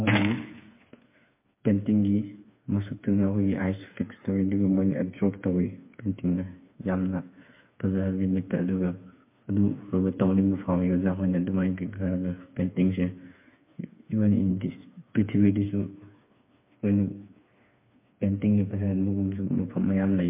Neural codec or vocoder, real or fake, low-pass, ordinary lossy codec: codec, 16 kHz, 4 kbps, FreqCodec, smaller model; fake; 3.6 kHz; MP3, 24 kbps